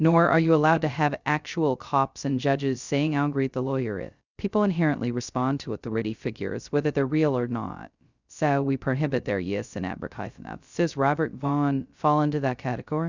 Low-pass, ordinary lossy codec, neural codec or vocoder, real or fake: 7.2 kHz; Opus, 64 kbps; codec, 16 kHz, 0.2 kbps, FocalCodec; fake